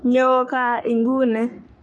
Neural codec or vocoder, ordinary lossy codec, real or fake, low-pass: codec, 44.1 kHz, 3.4 kbps, Pupu-Codec; none; fake; 10.8 kHz